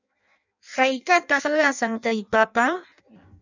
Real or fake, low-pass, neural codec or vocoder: fake; 7.2 kHz; codec, 16 kHz in and 24 kHz out, 0.6 kbps, FireRedTTS-2 codec